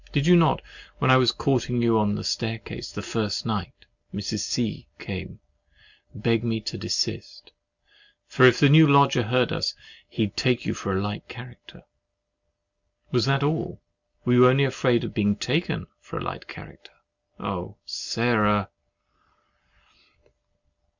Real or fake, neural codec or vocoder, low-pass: real; none; 7.2 kHz